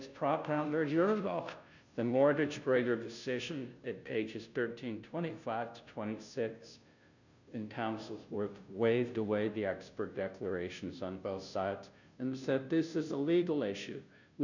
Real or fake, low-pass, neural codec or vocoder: fake; 7.2 kHz; codec, 16 kHz, 0.5 kbps, FunCodec, trained on Chinese and English, 25 frames a second